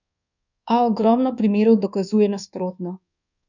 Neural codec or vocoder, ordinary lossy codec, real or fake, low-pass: codec, 24 kHz, 1.2 kbps, DualCodec; none; fake; 7.2 kHz